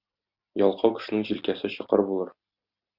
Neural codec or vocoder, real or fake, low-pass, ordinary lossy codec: none; real; 5.4 kHz; Opus, 64 kbps